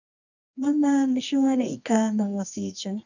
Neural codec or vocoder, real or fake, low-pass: codec, 24 kHz, 0.9 kbps, WavTokenizer, medium music audio release; fake; 7.2 kHz